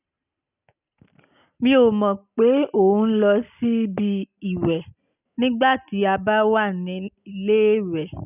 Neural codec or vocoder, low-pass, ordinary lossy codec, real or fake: none; 3.6 kHz; none; real